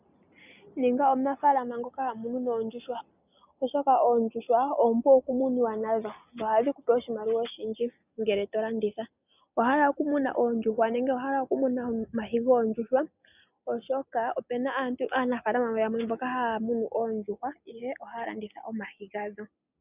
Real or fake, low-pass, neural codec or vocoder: real; 3.6 kHz; none